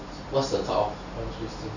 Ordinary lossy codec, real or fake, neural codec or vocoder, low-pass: none; real; none; 7.2 kHz